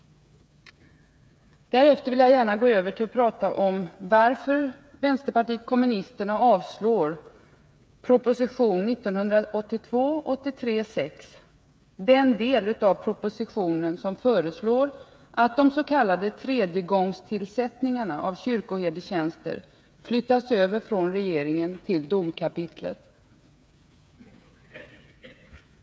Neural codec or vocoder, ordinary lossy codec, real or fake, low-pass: codec, 16 kHz, 8 kbps, FreqCodec, smaller model; none; fake; none